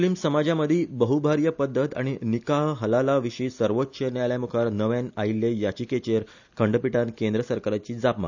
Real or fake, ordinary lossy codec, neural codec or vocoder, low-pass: real; none; none; 7.2 kHz